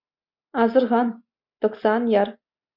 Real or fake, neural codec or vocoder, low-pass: real; none; 5.4 kHz